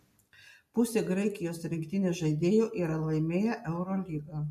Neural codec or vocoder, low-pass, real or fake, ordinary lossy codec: none; 14.4 kHz; real; MP3, 64 kbps